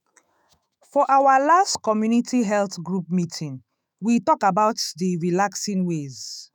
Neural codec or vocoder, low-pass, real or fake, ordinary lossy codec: autoencoder, 48 kHz, 128 numbers a frame, DAC-VAE, trained on Japanese speech; none; fake; none